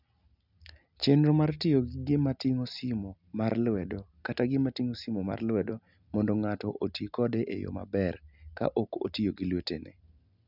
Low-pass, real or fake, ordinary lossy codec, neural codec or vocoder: 5.4 kHz; real; none; none